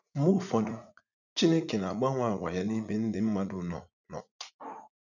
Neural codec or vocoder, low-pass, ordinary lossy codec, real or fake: vocoder, 44.1 kHz, 80 mel bands, Vocos; 7.2 kHz; none; fake